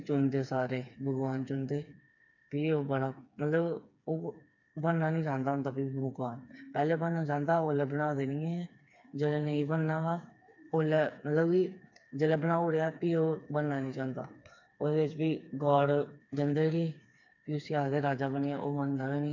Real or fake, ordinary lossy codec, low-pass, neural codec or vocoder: fake; none; 7.2 kHz; codec, 16 kHz, 4 kbps, FreqCodec, smaller model